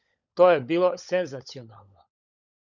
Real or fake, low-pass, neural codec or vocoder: fake; 7.2 kHz; codec, 16 kHz, 16 kbps, FunCodec, trained on LibriTTS, 50 frames a second